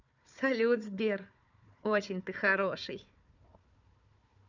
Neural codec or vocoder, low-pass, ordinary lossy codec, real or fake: codec, 16 kHz, 4 kbps, FunCodec, trained on Chinese and English, 50 frames a second; 7.2 kHz; none; fake